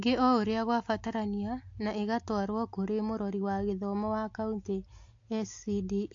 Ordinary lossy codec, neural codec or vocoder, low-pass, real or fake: AAC, 48 kbps; none; 7.2 kHz; real